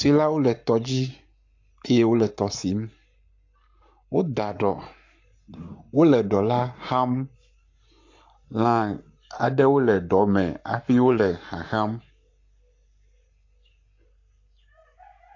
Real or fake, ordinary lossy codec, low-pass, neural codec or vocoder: fake; AAC, 48 kbps; 7.2 kHz; codec, 44.1 kHz, 7.8 kbps, Pupu-Codec